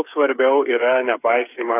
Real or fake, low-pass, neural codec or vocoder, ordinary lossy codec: fake; 3.6 kHz; codec, 16 kHz, 8 kbps, FreqCodec, smaller model; AAC, 16 kbps